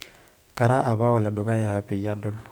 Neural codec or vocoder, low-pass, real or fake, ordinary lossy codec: codec, 44.1 kHz, 2.6 kbps, SNAC; none; fake; none